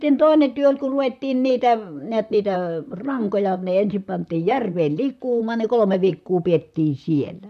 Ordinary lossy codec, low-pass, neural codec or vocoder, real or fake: none; 14.4 kHz; vocoder, 44.1 kHz, 128 mel bands, Pupu-Vocoder; fake